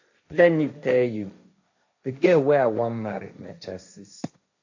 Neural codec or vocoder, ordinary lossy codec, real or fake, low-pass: codec, 16 kHz, 1.1 kbps, Voila-Tokenizer; AAC, 48 kbps; fake; 7.2 kHz